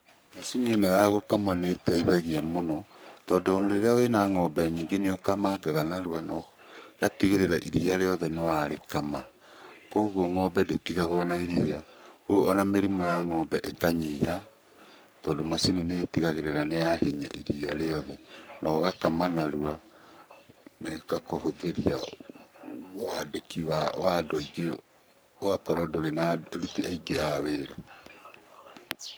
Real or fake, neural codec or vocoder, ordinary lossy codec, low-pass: fake; codec, 44.1 kHz, 3.4 kbps, Pupu-Codec; none; none